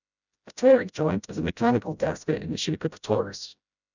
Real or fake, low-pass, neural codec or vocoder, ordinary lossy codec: fake; 7.2 kHz; codec, 16 kHz, 0.5 kbps, FreqCodec, smaller model; none